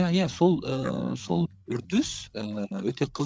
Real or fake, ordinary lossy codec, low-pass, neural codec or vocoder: fake; none; none; codec, 16 kHz, 8 kbps, FreqCodec, larger model